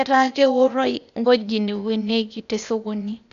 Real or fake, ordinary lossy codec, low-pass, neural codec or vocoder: fake; none; 7.2 kHz; codec, 16 kHz, 0.8 kbps, ZipCodec